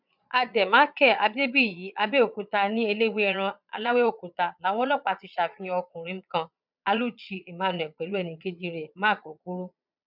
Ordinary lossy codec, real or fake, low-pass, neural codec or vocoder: none; fake; 5.4 kHz; vocoder, 22.05 kHz, 80 mel bands, Vocos